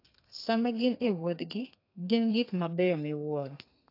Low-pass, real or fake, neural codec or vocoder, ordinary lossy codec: 5.4 kHz; fake; codec, 32 kHz, 1.9 kbps, SNAC; AAC, 32 kbps